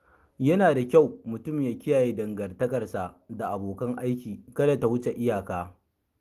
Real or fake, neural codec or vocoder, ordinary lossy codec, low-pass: real; none; Opus, 24 kbps; 14.4 kHz